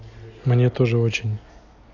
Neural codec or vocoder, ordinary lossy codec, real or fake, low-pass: none; none; real; 7.2 kHz